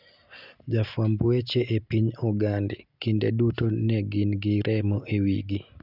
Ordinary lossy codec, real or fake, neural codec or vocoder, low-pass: none; real; none; 5.4 kHz